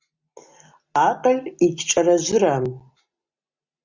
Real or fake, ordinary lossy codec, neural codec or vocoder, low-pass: real; Opus, 64 kbps; none; 7.2 kHz